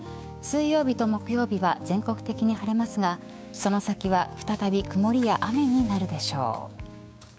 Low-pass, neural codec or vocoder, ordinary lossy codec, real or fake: none; codec, 16 kHz, 6 kbps, DAC; none; fake